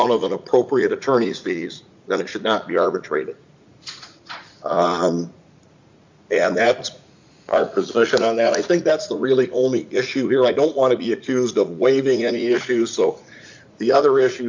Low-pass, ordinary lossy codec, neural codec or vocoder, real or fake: 7.2 kHz; MP3, 48 kbps; vocoder, 44.1 kHz, 80 mel bands, Vocos; fake